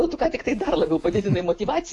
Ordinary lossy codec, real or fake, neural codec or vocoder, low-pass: AAC, 48 kbps; fake; vocoder, 48 kHz, 128 mel bands, Vocos; 10.8 kHz